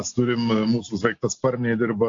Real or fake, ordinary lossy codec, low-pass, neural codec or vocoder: real; AAC, 48 kbps; 7.2 kHz; none